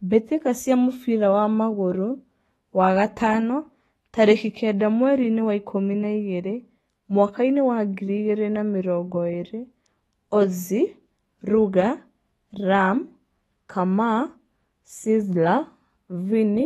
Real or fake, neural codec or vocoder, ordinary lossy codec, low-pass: fake; autoencoder, 48 kHz, 128 numbers a frame, DAC-VAE, trained on Japanese speech; AAC, 32 kbps; 19.8 kHz